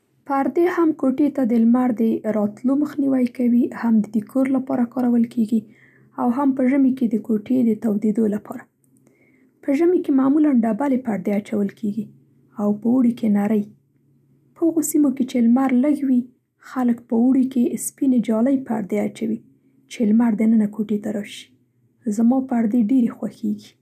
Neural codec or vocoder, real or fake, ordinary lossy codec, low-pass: none; real; none; 14.4 kHz